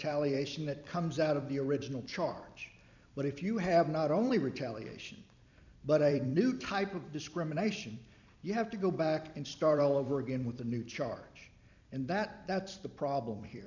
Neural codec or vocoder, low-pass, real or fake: vocoder, 44.1 kHz, 128 mel bands every 256 samples, BigVGAN v2; 7.2 kHz; fake